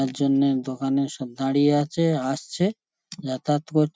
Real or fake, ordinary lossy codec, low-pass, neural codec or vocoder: real; none; none; none